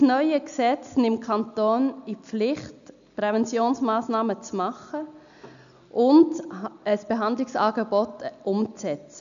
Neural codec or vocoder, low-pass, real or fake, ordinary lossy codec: none; 7.2 kHz; real; MP3, 96 kbps